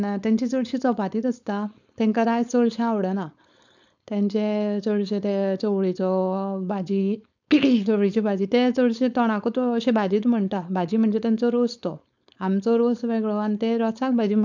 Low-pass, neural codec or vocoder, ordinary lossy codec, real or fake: 7.2 kHz; codec, 16 kHz, 4.8 kbps, FACodec; none; fake